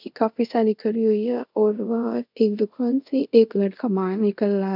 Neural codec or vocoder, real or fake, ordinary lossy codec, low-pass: codec, 24 kHz, 0.5 kbps, DualCodec; fake; none; 5.4 kHz